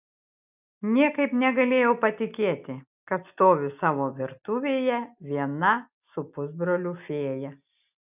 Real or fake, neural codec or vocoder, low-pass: real; none; 3.6 kHz